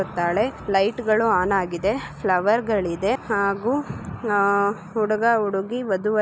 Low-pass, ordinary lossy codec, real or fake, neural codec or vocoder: none; none; real; none